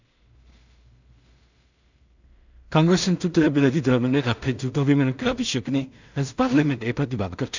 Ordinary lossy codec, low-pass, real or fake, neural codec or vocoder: none; 7.2 kHz; fake; codec, 16 kHz in and 24 kHz out, 0.4 kbps, LongCat-Audio-Codec, two codebook decoder